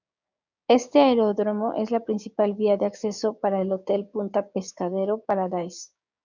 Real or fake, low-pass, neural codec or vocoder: fake; 7.2 kHz; codec, 16 kHz, 6 kbps, DAC